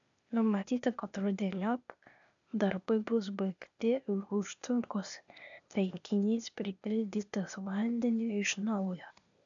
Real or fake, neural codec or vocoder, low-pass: fake; codec, 16 kHz, 0.8 kbps, ZipCodec; 7.2 kHz